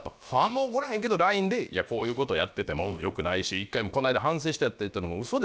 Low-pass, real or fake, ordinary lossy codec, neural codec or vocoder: none; fake; none; codec, 16 kHz, about 1 kbps, DyCAST, with the encoder's durations